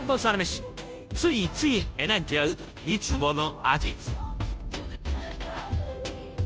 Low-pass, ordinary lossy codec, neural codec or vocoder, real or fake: none; none; codec, 16 kHz, 0.5 kbps, FunCodec, trained on Chinese and English, 25 frames a second; fake